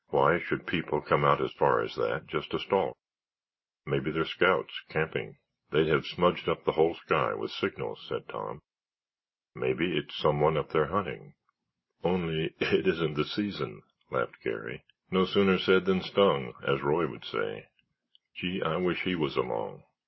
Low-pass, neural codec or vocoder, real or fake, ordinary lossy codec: 7.2 kHz; none; real; MP3, 24 kbps